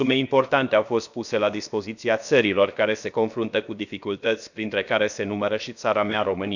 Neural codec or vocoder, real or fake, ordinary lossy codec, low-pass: codec, 16 kHz, about 1 kbps, DyCAST, with the encoder's durations; fake; none; 7.2 kHz